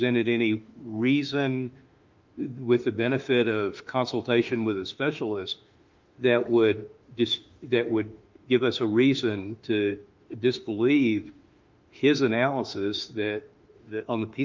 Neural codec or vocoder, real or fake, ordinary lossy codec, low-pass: autoencoder, 48 kHz, 32 numbers a frame, DAC-VAE, trained on Japanese speech; fake; Opus, 24 kbps; 7.2 kHz